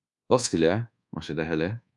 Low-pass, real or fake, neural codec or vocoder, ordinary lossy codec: 10.8 kHz; fake; codec, 24 kHz, 1.2 kbps, DualCodec; MP3, 96 kbps